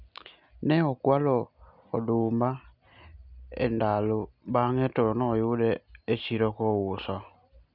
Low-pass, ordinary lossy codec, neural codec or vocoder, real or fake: 5.4 kHz; none; none; real